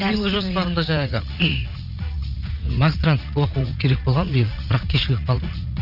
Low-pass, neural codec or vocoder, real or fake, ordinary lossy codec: 5.4 kHz; vocoder, 44.1 kHz, 80 mel bands, Vocos; fake; none